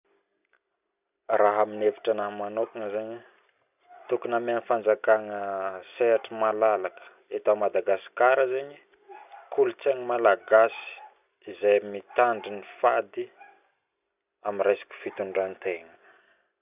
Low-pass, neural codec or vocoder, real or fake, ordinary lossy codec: 3.6 kHz; none; real; none